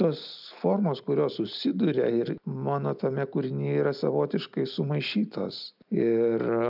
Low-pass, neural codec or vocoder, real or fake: 5.4 kHz; none; real